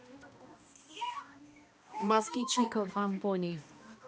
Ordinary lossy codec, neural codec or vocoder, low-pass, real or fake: none; codec, 16 kHz, 1 kbps, X-Codec, HuBERT features, trained on balanced general audio; none; fake